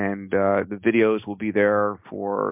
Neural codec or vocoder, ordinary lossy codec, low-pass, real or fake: none; MP3, 32 kbps; 3.6 kHz; real